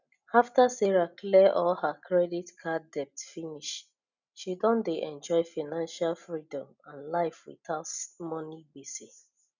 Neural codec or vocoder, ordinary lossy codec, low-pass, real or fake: none; none; 7.2 kHz; real